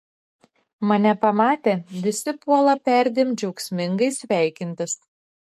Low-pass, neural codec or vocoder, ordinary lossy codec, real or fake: 14.4 kHz; codec, 44.1 kHz, 7.8 kbps, DAC; MP3, 64 kbps; fake